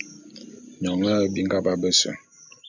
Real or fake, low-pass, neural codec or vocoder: real; 7.2 kHz; none